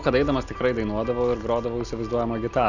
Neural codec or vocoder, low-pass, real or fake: none; 7.2 kHz; real